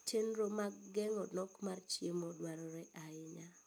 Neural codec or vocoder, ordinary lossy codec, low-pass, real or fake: none; none; none; real